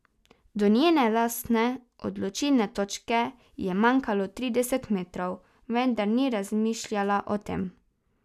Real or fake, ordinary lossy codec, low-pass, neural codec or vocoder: real; none; 14.4 kHz; none